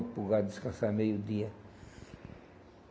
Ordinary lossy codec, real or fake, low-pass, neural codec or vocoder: none; real; none; none